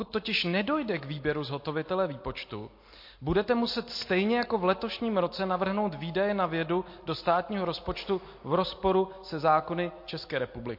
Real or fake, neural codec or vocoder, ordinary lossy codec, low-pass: real; none; MP3, 32 kbps; 5.4 kHz